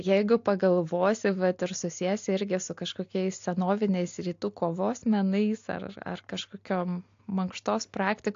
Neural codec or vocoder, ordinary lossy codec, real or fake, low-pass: none; AAC, 48 kbps; real; 7.2 kHz